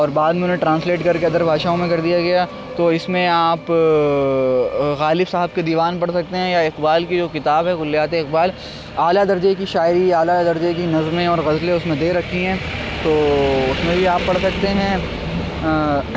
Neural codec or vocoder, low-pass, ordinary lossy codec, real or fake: none; none; none; real